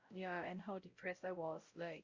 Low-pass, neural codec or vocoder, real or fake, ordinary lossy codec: 7.2 kHz; codec, 16 kHz, 0.5 kbps, X-Codec, WavLM features, trained on Multilingual LibriSpeech; fake; Opus, 64 kbps